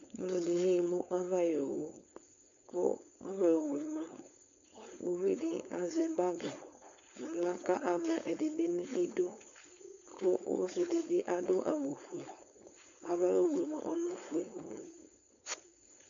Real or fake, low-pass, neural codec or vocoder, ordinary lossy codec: fake; 7.2 kHz; codec, 16 kHz, 4.8 kbps, FACodec; MP3, 64 kbps